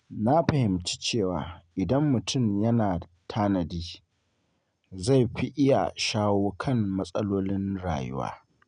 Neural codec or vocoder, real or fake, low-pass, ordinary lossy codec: none; real; 9.9 kHz; none